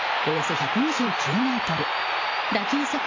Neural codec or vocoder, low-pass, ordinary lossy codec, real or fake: vocoder, 44.1 kHz, 128 mel bands every 512 samples, BigVGAN v2; 7.2 kHz; AAC, 32 kbps; fake